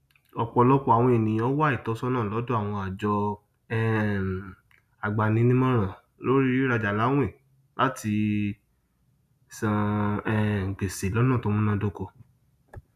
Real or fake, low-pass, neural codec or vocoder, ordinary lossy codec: real; 14.4 kHz; none; none